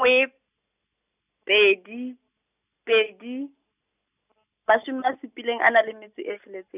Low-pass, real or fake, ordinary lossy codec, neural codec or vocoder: 3.6 kHz; real; none; none